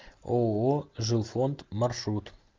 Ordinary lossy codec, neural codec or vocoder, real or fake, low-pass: Opus, 32 kbps; none; real; 7.2 kHz